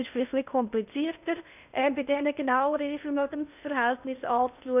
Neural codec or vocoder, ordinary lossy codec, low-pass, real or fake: codec, 16 kHz in and 24 kHz out, 0.6 kbps, FocalCodec, streaming, 2048 codes; none; 3.6 kHz; fake